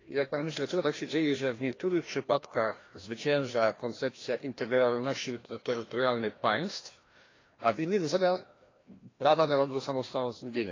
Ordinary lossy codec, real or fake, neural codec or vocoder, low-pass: AAC, 32 kbps; fake; codec, 16 kHz, 1 kbps, FreqCodec, larger model; 7.2 kHz